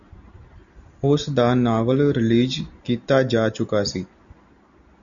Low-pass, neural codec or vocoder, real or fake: 7.2 kHz; none; real